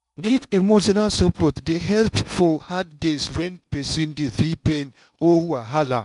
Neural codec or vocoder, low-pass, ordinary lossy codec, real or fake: codec, 16 kHz in and 24 kHz out, 0.8 kbps, FocalCodec, streaming, 65536 codes; 10.8 kHz; none; fake